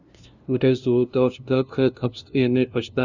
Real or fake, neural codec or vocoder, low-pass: fake; codec, 16 kHz, 0.5 kbps, FunCodec, trained on LibriTTS, 25 frames a second; 7.2 kHz